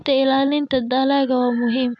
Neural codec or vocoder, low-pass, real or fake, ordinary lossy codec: none; none; real; none